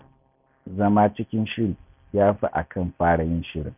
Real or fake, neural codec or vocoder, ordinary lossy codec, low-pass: real; none; none; 3.6 kHz